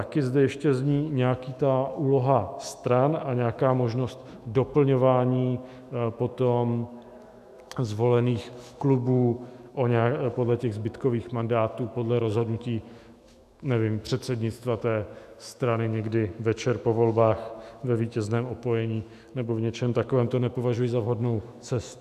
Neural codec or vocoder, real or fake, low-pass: autoencoder, 48 kHz, 128 numbers a frame, DAC-VAE, trained on Japanese speech; fake; 14.4 kHz